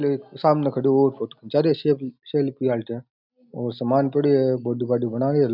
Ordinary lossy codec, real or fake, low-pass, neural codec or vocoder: none; real; 5.4 kHz; none